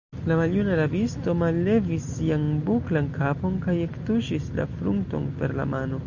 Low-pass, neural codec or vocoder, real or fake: 7.2 kHz; none; real